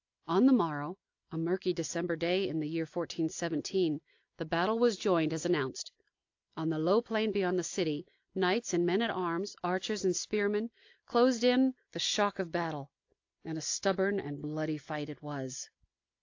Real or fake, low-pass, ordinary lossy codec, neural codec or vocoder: real; 7.2 kHz; AAC, 48 kbps; none